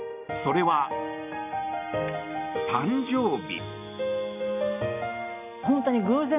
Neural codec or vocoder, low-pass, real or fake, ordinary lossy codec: none; 3.6 kHz; real; none